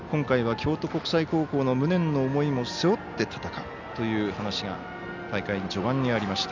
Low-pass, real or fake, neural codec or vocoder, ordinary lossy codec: 7.2 kHz; real; none; none